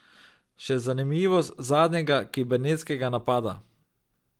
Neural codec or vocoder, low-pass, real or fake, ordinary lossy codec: none; 19.8 kHz; real; Opus, 24 kbps